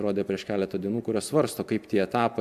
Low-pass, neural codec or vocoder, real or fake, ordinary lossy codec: 14.4 kHz; none; real; MP3, 96 kbps